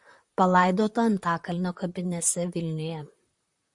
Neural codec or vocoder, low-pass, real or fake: vocoder, 44.1 kHz, 128 mel bands, Pupu-Vocoder; 10.8 kHz; fake